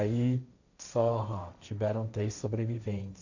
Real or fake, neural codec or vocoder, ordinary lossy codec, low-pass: fake; codec, 16 kHz, 1.1 kbps, Voila-Tokenizer; none; 7.2 kHz